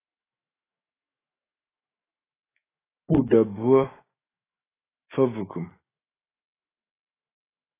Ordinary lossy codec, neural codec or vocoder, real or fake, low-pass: AAC, 16 kbps; autoencoder, 48 kHz, 128 numbers a frame, DAC-VAE, trained on Japanese speech; fake; 3.6 kHz